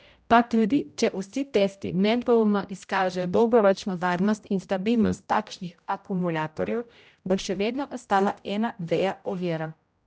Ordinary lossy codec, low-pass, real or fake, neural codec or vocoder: none; none; fake; codec, 16 kHz, 0.5 kbps, X-Codec, HuBERT features, trained on general audio